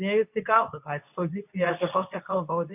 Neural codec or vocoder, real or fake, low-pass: codec, 24 kHz, 0.9 kbps, WavTokenizer, medium speech release version 2; fake; 3.6 kHz